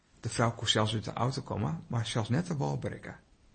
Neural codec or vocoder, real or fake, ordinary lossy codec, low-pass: none; real; MP3, 32 kbps; 9.9 kHz